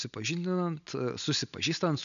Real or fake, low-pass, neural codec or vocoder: real; 7.2 kHz; none